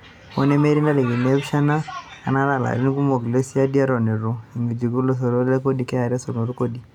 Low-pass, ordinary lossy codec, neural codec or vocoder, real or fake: 19.8 kHz; none; none; real